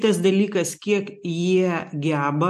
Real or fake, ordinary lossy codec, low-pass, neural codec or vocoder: real; MP3, 64 kbps; 14.4 kHz; none